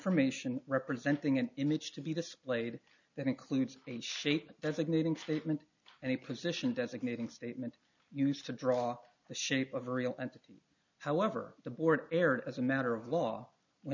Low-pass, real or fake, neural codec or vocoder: 7.2 kHz; real; none